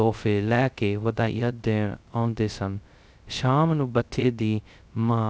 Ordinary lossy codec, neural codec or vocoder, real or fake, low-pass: none; codec, 16 kHz, 0.2 kbps, FocalCodec; fake; none